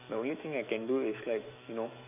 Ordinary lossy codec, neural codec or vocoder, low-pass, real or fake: none; autoencoder, 48 kHz, 128 numbers a frame, DAC-VAE, trained on Japanese speech; 3.6 kHz; fake